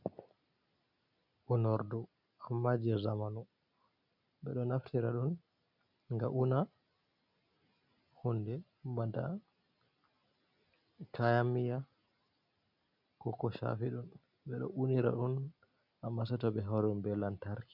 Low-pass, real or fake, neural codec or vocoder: 5.4 kHz; real; none